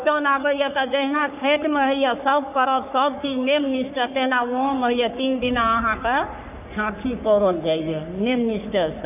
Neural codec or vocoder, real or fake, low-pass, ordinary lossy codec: codec, 44.1 kHz, 3.4 kbps, Pupu-Codec; fake; 3.6 kHz; none